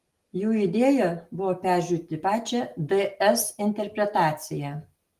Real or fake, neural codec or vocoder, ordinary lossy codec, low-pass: real; none; Opus, 24 kbps; 14.4 kHz